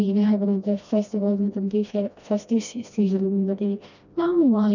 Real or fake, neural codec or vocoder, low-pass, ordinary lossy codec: fake; codec, 16 kHz, 1 kbps, FreqCodec, smaller model; 7.2 kHz; none